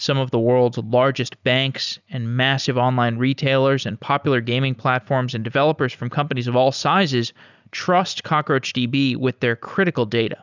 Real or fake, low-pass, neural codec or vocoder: real; 7.2 kHz; none